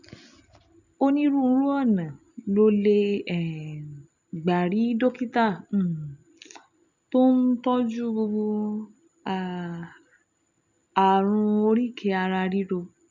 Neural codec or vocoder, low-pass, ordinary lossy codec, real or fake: none; 7.2 kHz; none; real